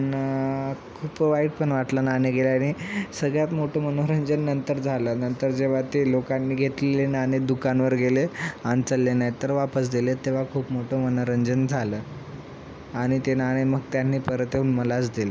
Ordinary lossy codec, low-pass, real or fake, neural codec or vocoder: none; none; real; none